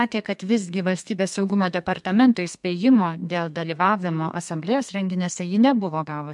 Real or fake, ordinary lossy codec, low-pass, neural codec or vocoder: fake; MP3, 64 kbps; 10.8 kHz; codec, 32 kHz, 1.9 kbps, SNAC